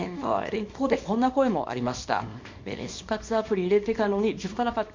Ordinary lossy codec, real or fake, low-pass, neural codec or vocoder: MP3, 48 kbps; fake; 7.2 kHz; codec, 24 kHz, 0.9 kbps, WavTokenizer, small release